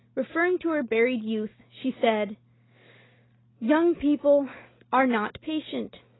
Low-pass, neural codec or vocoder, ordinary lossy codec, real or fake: 7.2 kHz; none; AAC, 16 kbps; real